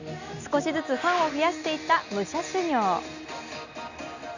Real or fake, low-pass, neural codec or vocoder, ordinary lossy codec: real; 7.2 kHz; none; none